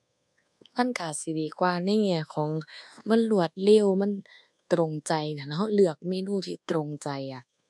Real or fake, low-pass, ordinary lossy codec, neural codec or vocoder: fake; none; none; codec, 24 kHz, 1.2 kbps, DualCodec